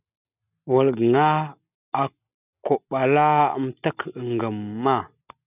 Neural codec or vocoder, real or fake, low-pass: none; real; 3.6 kHz